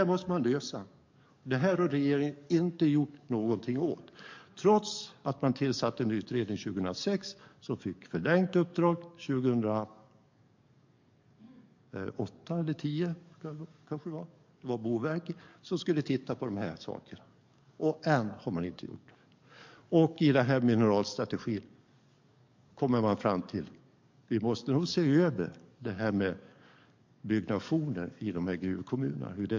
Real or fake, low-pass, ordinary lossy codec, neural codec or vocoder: fake; 7.2 kHz; MP3, 48 kbps; codec, 44.1 kHz, 7.8 kbps, DAC